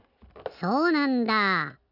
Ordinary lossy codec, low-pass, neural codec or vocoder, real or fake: none; 5.4 kHz; none; real